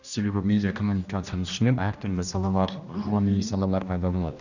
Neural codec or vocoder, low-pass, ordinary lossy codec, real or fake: codec, 16 kHz, 1 kbps, X-Codec, HuBERT features, trained on general audio; 7.2 kHz; none; fake